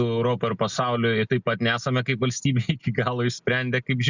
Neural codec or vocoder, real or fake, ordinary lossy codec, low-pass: none; real; Opus, 64 kbps; 7.2 kHz